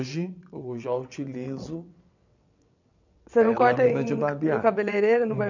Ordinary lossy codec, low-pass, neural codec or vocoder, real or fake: none; 7.2 kHz; vocoder, 22.05 kHz, 80 mel bands, WaveNeXt; fake